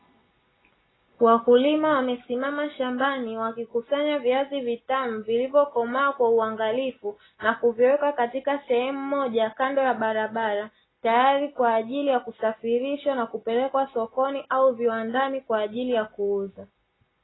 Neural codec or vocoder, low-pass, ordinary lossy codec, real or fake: none; 7.2 kHz; AAC, 16 kbps; real